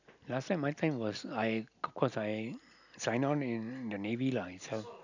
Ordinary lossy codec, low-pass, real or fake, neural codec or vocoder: none; 7.2 kHz; real; none